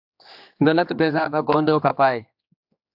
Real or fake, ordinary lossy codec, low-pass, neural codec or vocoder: fake; AAC, 48 kbps; 5.4 kHz; codec, 16 kHz, 2 kbps, X-Codec, HuBERT features, trained on general audio